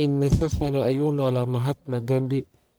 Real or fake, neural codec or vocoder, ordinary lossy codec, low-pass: fake; codec, 44.1 kHz, 1.7 kbps, Pupu-Codec; none; none